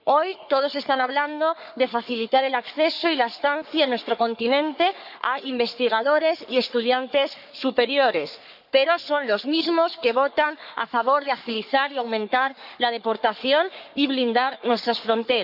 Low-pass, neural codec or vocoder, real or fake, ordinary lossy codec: 5.4 kHz; codec, 44.1 kHz, 3.4 kbps, Pupu-Codec; fake; none